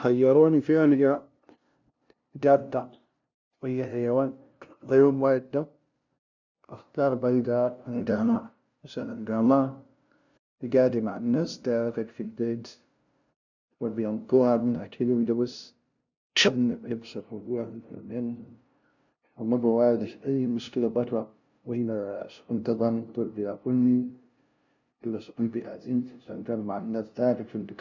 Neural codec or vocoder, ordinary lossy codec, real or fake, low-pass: codec, 16 kHz, 0.5 kbps, FunCodec, trained on LibriTTS, 25 frames a second; none; fake; 7.2 kHz